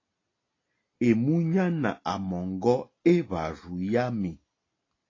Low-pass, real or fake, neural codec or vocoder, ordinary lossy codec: 7.2 kHz; real; none; AAC, 32 kbps